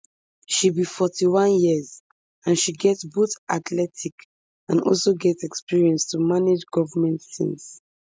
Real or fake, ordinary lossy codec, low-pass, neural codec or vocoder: real; none; none; none